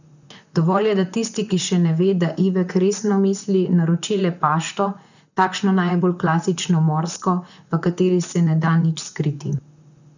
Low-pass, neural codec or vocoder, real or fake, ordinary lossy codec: 7.2 kHz; vocoder, 44.1 kHz, 128 mel bands, Pupu-Vocoder; fake; none